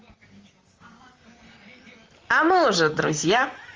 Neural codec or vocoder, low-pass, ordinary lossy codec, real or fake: vocoder, 44.1 kHz, 128 mel bands, Pupu-Vocoder; 7.2 kHz; Opus, 32 kbps; fake